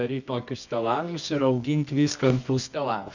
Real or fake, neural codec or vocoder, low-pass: fake; codec, 24 kHz, 0.9 kbps, WavTokenizer, medium music audio release; 7.2 kHz